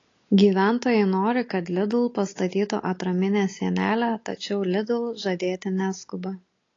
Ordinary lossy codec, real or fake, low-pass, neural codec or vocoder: AAC, 32 kbps; real; 7.2 kHz; none